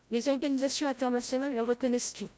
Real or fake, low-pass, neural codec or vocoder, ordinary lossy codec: fake; none; codec, 16 kHz, 0.5 kbps, FreqCodec, larger model; none